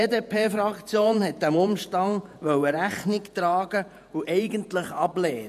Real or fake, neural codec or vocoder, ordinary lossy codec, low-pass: fake; vocoder, 48 kHz, 128 mel bands, Vocos; MP3, 96 kbps; 14.4 kHz